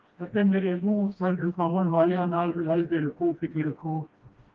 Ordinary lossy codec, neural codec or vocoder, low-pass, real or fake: Opus, 32 kbps; codec, 16 kHz, 1 kbps, FreqCodec, smaller model; 7.2 kHz; fake